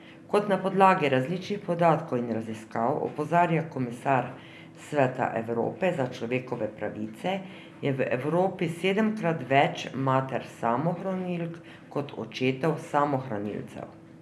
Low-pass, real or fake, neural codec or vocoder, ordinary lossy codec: none; real; none; none